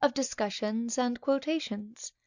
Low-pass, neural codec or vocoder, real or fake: 7.2 kHz; none; real